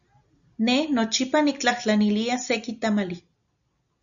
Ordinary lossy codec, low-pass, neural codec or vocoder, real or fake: MP3, 96 kbps; 7.2 kHz; none; real